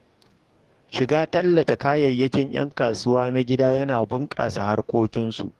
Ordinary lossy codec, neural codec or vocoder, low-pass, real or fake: Opus, 24 kbps; codec, 44.1 kHz, 2.6 kbps, DAC; 14.4 kHz; fake